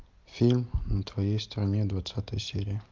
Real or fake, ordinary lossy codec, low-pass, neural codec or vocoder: real; Opus, 32 kbps; 7.2 kHz; none